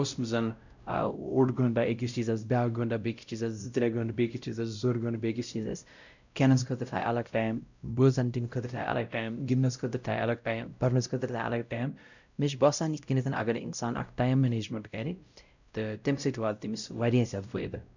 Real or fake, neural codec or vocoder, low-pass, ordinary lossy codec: fake; codec, 16 kHz, 0.5 kbps, X-Codec, WavLM features, trained on Multilingual LibriSpeech; 7.2 kHz; none